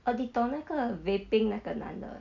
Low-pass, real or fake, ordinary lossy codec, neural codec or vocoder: 7.2 kHz; real; none; none